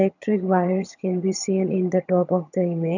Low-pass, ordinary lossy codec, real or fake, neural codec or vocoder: 7.2 kHz; none; fake; vocoder, 22.05 kHz, 80 mel bands, HiFi-GAN